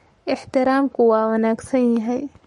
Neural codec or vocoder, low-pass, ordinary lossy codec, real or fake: codec, 44.1 kHz, 7.8 kbps, Pupu-Codec; 19.8 kHz; MP3, 48 kbps; fake